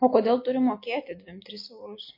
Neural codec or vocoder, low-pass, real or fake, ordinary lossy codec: none; 5.4 kHz; real; MP3, 32 kbps